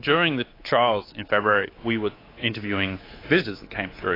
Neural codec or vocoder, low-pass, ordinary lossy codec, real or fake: codec, 24 kHz, 3.1 kbps, DualCodec; 5.4 kHz; AAC, 24 kbps; fake